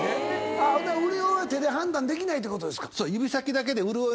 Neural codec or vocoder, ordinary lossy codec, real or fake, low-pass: none; none; real; none